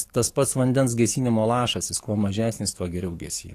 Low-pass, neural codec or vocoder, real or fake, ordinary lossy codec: 14.4 kHz; codec, 44.1 kHz, 7.8 kbps, DAC; fake; AAC, 48 kbps